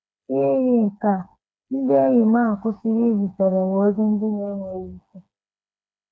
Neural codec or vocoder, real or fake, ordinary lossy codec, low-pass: codec, 16 kHz, 4 kbps, FreqCodec, smaller model; fake; none; none